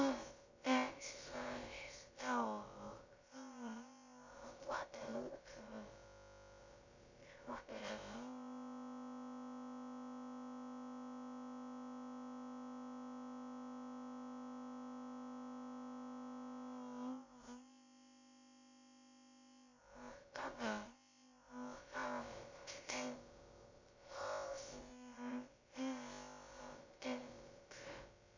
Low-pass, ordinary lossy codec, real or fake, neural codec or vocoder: 7.2 kHz; MP3, 64 kbps; fake; codec, 16 kHz, about 1 kbps, DyCAST, with the encoder's durations